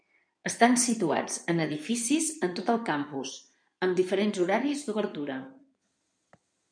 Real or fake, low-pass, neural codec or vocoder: fake; 9.9 kHz; codec, 16 kHz in and 24 kHz out, 2.2 kbps, FireRedTTS-2 codec